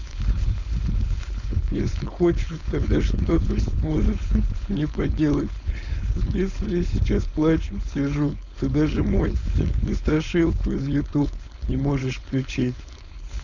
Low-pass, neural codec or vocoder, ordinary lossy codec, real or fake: 7.2 kHz; codec, 16 kHz, 4.8 kbps, FACodec; none; fake